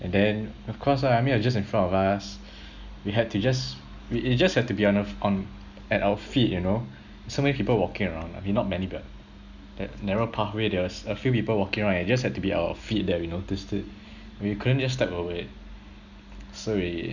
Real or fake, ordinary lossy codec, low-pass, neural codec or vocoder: real; Opus, 64 kbps; 7.2 kHz; none